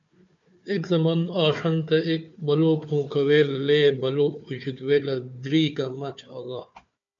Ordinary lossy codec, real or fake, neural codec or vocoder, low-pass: MP3, 64 kbps; fake; codec, 16 kHz, 4 kbps, FunCodec, trained on Chinese and English, 50 frames a second; 7.2 kHz